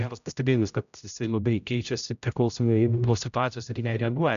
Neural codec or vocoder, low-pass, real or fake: codec, 16 kHz, 0.5 kbps, X-Codec, HuBERT features, trained on general audio; 7.2 kHz; fake